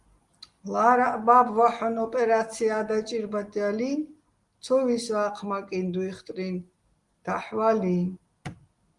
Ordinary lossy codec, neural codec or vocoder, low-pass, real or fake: Opus, 24 kbps; none; 10.8 kHz; real